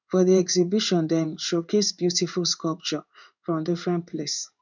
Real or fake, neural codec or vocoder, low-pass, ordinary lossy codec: fake; codec, 16 kHz in and 24 kHz out, 1 kbps, XY-Tokenizer; 7.2 kHz; none